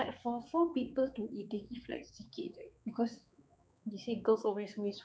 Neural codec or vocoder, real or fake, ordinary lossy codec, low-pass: codec, 16 kHz, 4 kbps, X-Codec, HuBERT features, trained on balanced general audio; fake; none; none